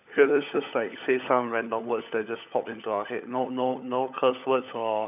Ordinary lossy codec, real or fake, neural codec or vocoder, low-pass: none; fake; codec, 16 kHz, 16 kbps, FunCodec, trained on LibriTTS, 50 frames a second; 3.6 kHz